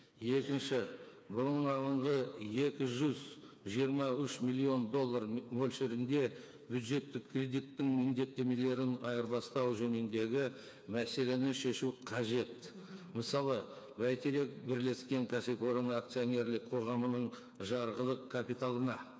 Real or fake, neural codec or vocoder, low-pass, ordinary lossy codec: fake; codec, 16 kHz, 4 kbps, FreqCodec, smaller model; none; none